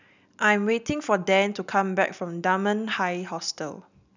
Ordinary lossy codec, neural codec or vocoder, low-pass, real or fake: none; none; 7.2 kHz; real